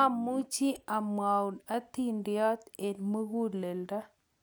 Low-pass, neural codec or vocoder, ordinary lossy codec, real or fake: none; none; none; real